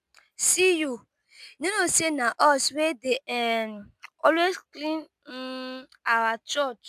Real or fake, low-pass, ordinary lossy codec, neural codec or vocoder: real; 14.4 kHz; none; none